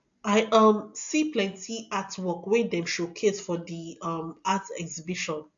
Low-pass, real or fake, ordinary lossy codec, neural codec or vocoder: 7.2 kHz; real; AAC, 64 kbps; none